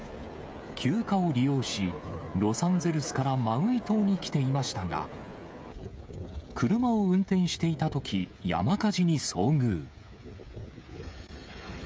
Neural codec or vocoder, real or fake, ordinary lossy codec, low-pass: codec, 16 kHz, 16 kbps, FreqCodec, smaller model; fake; none; none